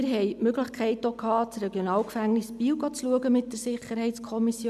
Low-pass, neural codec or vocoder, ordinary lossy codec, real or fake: 14.4 kHz; none; none; real